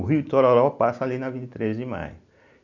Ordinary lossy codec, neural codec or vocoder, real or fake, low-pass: none; none; real; 7.2 kHz